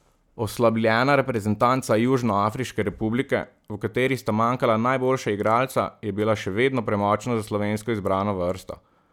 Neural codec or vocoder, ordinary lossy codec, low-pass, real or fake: none; none; 19.8 kHz; real